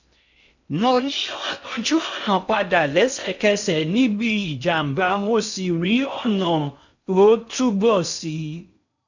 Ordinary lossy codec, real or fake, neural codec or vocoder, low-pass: none; fake; codec, 16 kHz in and 24 kHz out, 0.6 kbps, FocalCodec, streaming, 2048 codes; 7.2 kHz